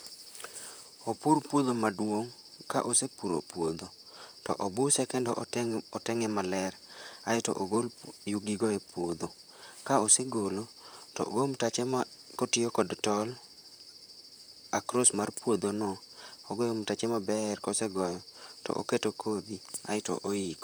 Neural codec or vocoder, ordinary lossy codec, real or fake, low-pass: vocoder, 44.1 kHz, 128 mel bands, Pupu-Vocoder; none; fake; none